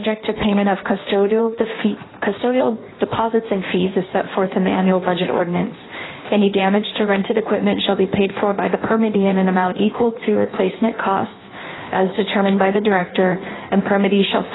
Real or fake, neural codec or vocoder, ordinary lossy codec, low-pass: fake; codec, 16 kHz in and 24 kHz out, 1.1 kbps, FireRedTTS-2 codec; AAC, 16 kbps; 7.2 kHz